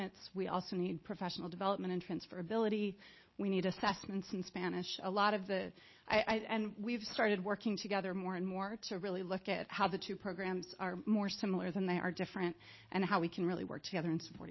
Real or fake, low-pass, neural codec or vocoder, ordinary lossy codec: real; 7.2 kHz; none; MP3, 24 kbps